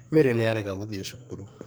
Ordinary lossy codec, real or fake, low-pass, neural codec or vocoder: none; fake; none; codec, 44.1 kHz, 2.6 kbps, SNAC